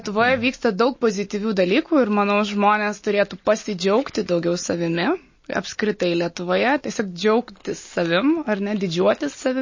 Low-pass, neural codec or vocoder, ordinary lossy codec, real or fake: 7.2 kHz; none; MP3, 32 kbps; real